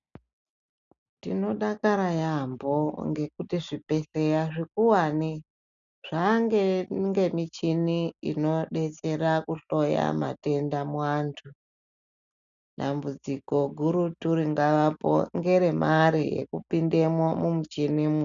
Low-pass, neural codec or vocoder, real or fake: 7.2 kHz; none; real